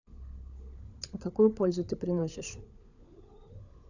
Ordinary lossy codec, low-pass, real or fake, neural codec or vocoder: none; 7.2 kHz; fake; codec, 16 kHz, 16 kbps, FunCodec, trained on LibriTTS, 50 frames a second